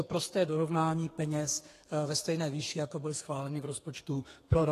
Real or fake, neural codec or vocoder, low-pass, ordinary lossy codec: fake; codec, 32 kHz, 1.9 kbps, SNAC; 14.4 kHz; AAC, 48 kbps